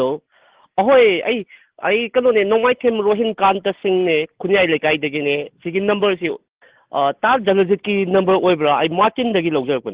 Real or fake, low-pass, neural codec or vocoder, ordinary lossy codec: real; 3.6 kHz; none; Opus, 16 kbps